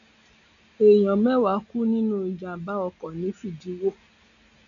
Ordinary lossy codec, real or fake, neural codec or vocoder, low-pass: none; real; none; 7.2 kHz